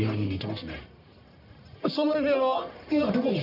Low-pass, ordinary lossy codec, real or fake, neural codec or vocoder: 5.4 kHz; none; fake; codec, 44.1 kHz, 1.7 kbps, Pupu-Codec